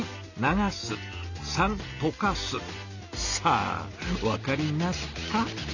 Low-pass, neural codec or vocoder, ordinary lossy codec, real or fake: 7.2 kHz; none; none; real